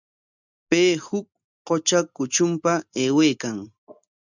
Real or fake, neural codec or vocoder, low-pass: real; none; 7.2 kHz